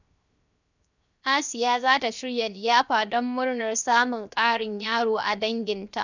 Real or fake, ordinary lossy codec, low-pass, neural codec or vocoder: fake; none; 7.2 kHz; codec, 16 kHz, 0.7 kbps, FocalCodec